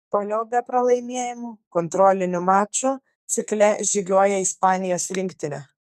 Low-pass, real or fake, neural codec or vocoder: 14.4 kHz; fake; codec, 44.1 kHz, 2.6 kbps, SNAC